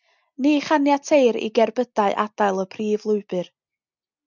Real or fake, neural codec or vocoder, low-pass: real; none; 7.2 kHz